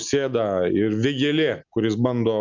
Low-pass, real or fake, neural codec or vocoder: 7.2 kHz; real; none